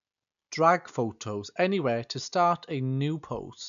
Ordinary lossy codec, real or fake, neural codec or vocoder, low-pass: none; real; none; 7.2 kHz